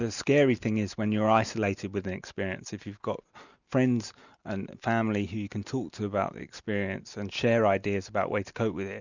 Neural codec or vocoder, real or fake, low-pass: none; real; 7.2 kHz